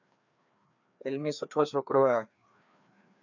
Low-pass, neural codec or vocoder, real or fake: 7.2 kHz; codec, 16 kHz, 2 kbps, FreqCodec, larger model; fake